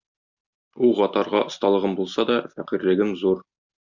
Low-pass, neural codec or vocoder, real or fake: 7.2 kHz; none; real